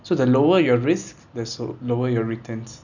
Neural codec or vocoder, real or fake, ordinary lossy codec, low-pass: none; real; none; 7.2 kHz